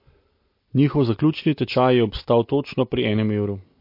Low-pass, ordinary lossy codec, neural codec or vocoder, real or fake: 5.4 kHz; MP3, 32 kbps; none; real